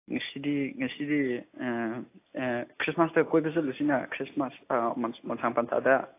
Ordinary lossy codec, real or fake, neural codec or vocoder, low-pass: AAC, 24 kbps; real; none; 3.6 kHz